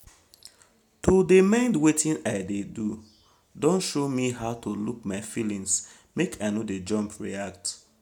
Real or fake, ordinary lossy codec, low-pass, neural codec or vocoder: real; none; 19.8 kHz; none